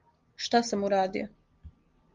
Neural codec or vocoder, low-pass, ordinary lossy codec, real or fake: none; 7.2 kHz; Opus, 32 kbps; real